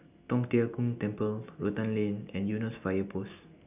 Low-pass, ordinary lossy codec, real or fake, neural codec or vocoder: 3.6 kHz; none; real; none